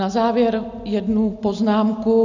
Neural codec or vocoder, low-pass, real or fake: none; 7.2 kHz; real